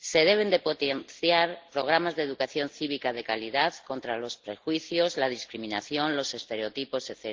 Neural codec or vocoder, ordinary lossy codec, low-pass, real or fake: none; Opus, 16 kbps; 7.2 kHz; real